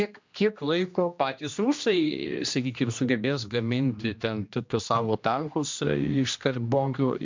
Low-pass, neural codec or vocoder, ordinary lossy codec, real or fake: 7.2 kHz; codec, 16 kHz, 1 kbps, X-Codec, HuBERT features, trained on general audio; MP3, 64 kbps; fake